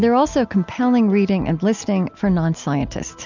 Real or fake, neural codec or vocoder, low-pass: real; none; 7.2 kHz